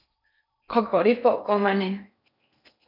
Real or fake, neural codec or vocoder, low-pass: fake; codec, 16 kHz in and 24 kHz out, 0.6 kbps, FocalCodec, streaming, 4096 codes; 5.4 kHz